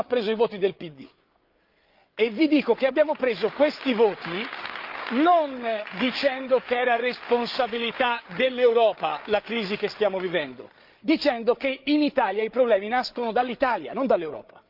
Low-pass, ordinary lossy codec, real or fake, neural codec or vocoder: 5.4 kHz; Opus, 24 kbps; fake; codec, 16 kHz, 8 kbps, FreqCodec, larger model